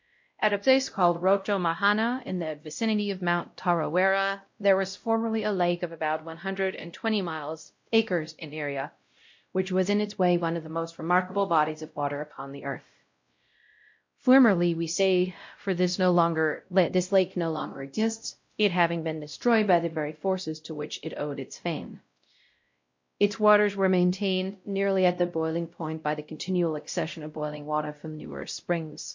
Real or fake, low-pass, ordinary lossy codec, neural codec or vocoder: fake; 7.2 kHz; MP3, 48 kbps; codec, 16 kHz, 0.5 kbps, X-Codec, WavLM features, trained on Multilingual LibriSpeech